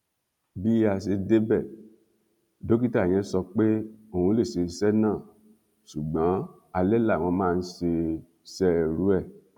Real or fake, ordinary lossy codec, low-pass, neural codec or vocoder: real; none; 19.8 kHz; none